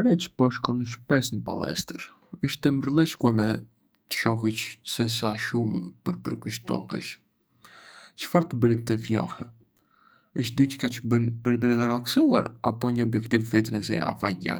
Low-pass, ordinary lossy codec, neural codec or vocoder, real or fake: none; none; codec, 44.1 kHz, 2.6 kbps, SNAC; fake